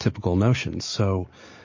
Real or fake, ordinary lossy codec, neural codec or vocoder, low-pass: real; MP3, 32 kbps; none; 7.2 kHz